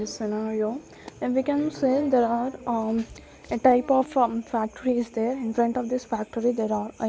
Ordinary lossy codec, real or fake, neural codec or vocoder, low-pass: none; real; none; none